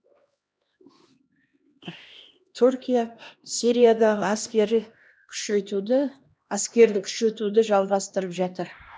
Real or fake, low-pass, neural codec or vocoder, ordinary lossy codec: fake; none; codec, 16 kHz, 1 kbps, X-Codec, HuBERT features, trained on LibriSpeech; none